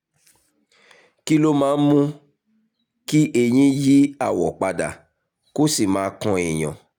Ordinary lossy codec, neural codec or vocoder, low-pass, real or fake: none; none; 19.8 kHz; real